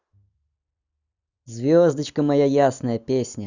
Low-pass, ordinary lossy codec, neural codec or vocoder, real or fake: 7.2 kHz; none; none; real